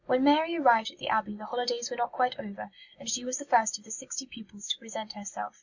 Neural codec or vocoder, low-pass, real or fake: none; 7.2 kHz; real